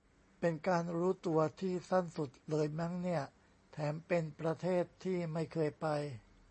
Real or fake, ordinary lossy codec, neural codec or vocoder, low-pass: real; MP3, 32 kbps; none; 10.8 kHz